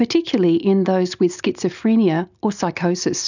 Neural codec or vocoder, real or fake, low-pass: none; real; 7.2 kHz